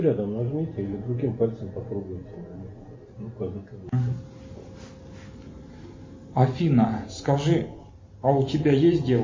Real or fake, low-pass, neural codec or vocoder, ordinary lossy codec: real; 7.2 kHz; none; MP3, 32 kbps